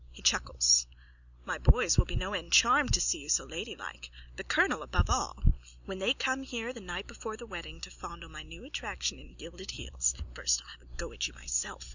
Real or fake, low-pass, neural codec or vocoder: real; 7.2 kHz; none